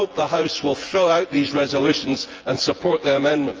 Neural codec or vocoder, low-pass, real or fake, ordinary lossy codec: vocoder, 24 kHz, 100 mel bands, Vocos; 7.2 kHz; fake; Opus, 24 kbps